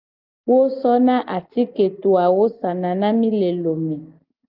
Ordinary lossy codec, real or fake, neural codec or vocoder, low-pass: Opus, 32 kbps; real; none; 5.4 kHz